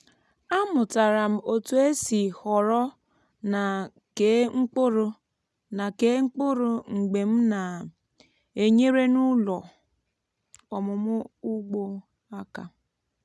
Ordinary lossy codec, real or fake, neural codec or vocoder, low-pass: none; real; none; none